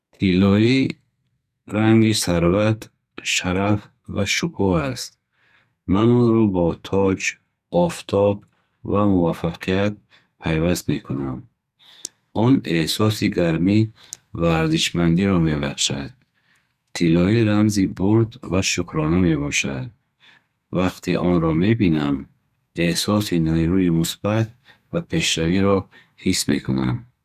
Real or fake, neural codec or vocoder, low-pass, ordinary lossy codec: fake; codec, 44.1 kHz, 2.6 kbps, SNAC; 14.4 kHz; none